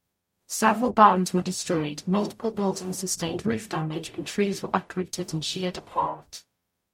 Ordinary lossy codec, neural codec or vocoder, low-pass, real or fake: MP3, 64 kbps; codec, 44.1 kHz, 0.9 kbps, DAC; 19.8 kHz; fake